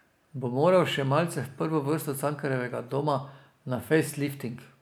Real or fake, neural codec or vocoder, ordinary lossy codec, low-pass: real; none; none; none